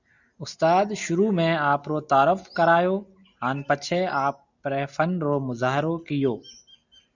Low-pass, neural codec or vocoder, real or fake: 7.2 kHz; none; real